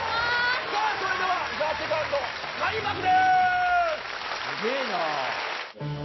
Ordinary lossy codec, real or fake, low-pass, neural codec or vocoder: MP3, 24 kbps; real; 7.2 kHz; none